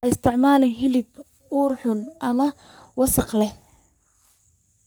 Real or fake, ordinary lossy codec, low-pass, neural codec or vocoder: fake; none; none; codec, 44.1 kHz, 3.4 kbps, Pupu-Codec